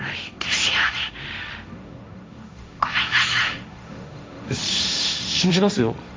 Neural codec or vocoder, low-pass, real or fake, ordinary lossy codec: codec, 16 kHz, 1.1 kbps, Voila-Tokenizer; none; fake; none